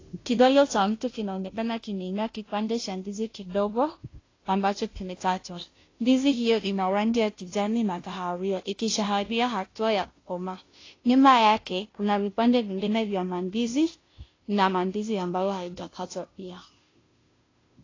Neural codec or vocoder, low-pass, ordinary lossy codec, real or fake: codec, 16 kHz, 0.5 kbps, FunCodec, trained on Chinese and English, 25 frames a second; 7.2 kHz; AAC, 32 kbps; fake